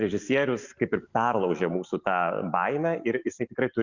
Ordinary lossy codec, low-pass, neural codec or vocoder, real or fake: Opus, 64 kbps; 7.2 kHz; none; real